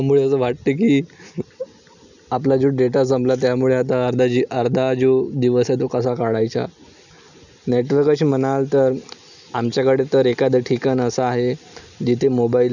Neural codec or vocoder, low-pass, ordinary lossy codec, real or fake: none; 7.2 kHz; none; real